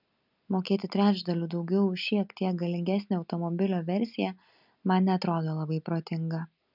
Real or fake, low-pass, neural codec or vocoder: real; 5.4 kHz; none